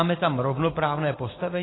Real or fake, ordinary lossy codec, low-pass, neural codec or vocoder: real; AAC, 16 kbps; 7.2 kHz; none